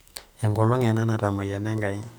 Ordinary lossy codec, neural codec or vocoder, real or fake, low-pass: none; codec, 44.1 kHz, 2.6 kbps, SNAC; fake; none